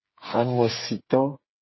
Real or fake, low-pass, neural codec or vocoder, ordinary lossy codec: fake; 7.2 kHz; codec, 16 kHz, 8 kbps, FreqCodec, smaller model; MP3, 24 kbps